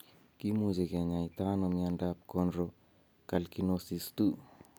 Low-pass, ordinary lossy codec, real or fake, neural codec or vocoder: none; none; real; none